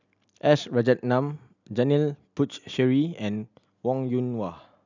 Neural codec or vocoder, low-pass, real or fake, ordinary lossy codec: none; 7.2 kHz; real; none